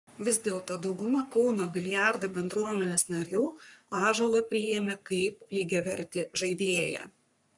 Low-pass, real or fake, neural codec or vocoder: 10.8 kHz; fake; codec, 44.1 kHz, 3.4 kbps, Pupu-Codec